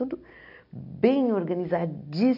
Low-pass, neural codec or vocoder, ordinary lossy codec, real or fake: 5.4 kHz; none; MP3, 48 kbps; real